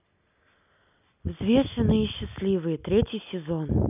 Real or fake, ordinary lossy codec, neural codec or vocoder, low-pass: real; none; none; 3.6 kHz